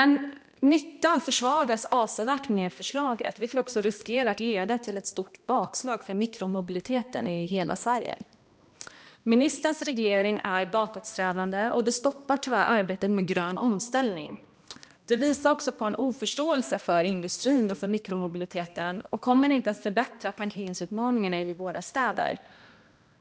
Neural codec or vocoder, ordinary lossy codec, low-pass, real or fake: codec, 16 kHz, 1 kbps, X-Codec, HuBERT features, trained on balanced general audio; none; none; fake